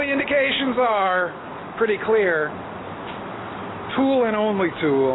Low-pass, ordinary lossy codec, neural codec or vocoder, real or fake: 7.2 kHz; AAC, 16 kbps; none; real